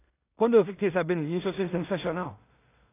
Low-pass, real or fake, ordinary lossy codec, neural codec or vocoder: 3.6 kHz; fake; AAC, 24 kbps; codec, 16 kHz in and 24 kHz out, 0.4 kbps, LongCat-Audio-Codec, two codebook decoder